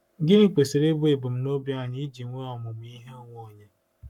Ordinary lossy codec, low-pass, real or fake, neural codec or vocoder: none; 19.8 kHz; fake; codec, 44.1 kHz, 7.8 kbps, Pupu-Codec